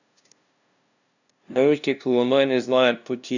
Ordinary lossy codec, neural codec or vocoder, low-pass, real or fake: none; codec, 16 kHz, 0.5 kbps, FunCodec, trained on LibriTTS, 25 frames a second; 7.2 kHz; fake